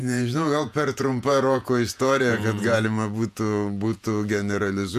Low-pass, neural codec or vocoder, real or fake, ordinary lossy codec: 14.4 kHz; vocoder, 48 kHz, 128 mel bands, Vocos; fake; MP3, 96 kbps